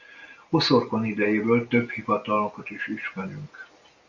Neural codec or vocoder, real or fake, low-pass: none; real; 7.2 kHz